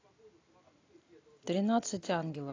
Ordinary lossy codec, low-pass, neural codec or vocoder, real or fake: AAC, 32 kbps; 7.2 kHz; none; real